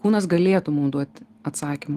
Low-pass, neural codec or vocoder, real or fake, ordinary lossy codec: 14.4 kHz; none; real; Opus, 24 kbps